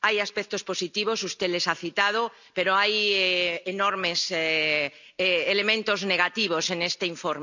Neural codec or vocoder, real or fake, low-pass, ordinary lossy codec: none; real; 7.2 kHz; none